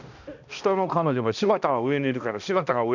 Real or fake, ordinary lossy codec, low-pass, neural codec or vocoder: fake; none; 7.2 kHz; codec, 16 kHz, 1 kbps, X-Codec, HuBERT features, trained on balanced general audio